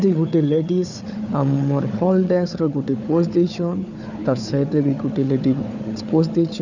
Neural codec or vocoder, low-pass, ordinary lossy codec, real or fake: codec, 16 kHz, 4 kbps, FunCodec, trained on Chinese and English, 50 frames a second; 7.2 kHz; none; fake